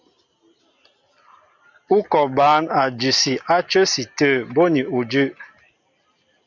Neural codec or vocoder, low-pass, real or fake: none; 7.2 kHz; real